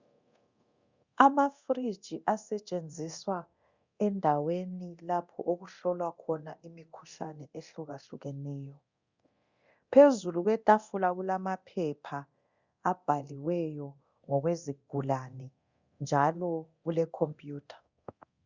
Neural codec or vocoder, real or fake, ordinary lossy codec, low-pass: codec, 24 kHz, 0.9 kbps, DualCodec; fake; Opus, 64 kbps; 7.2 kHz